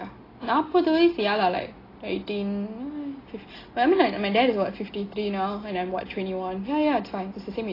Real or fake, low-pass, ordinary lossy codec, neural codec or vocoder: real; 5.4 kHz; AAC, 24 kbps; none